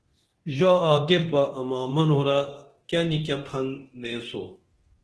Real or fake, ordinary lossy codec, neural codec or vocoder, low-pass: fake; Opus, 16 kbps; codec, 24 kHz, 0.9 kbps, DualCodec; 10.8 kHz